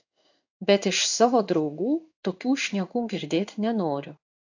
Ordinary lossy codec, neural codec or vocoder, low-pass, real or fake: AAC, 48 kbps; codec, 16 kHz in and 24 kHz out, 1 kbps, XY-Tokenizer; 7.2 kHz; fake